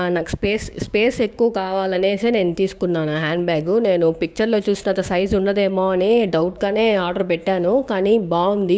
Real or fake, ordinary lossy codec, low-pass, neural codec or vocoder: fake; none; none; codec, 16 kHz, 6 kbps, DAC